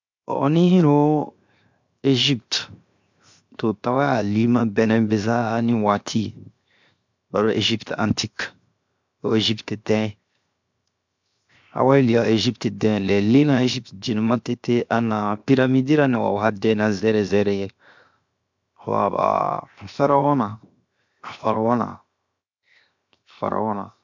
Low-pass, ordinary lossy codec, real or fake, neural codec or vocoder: 7.2 kHz; MP3, 64 kbps; fake; codec, 16 kHz, 0.7 kbps, FocalCodec